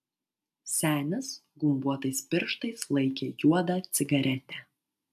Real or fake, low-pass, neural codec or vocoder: real; 14.4 kHz; none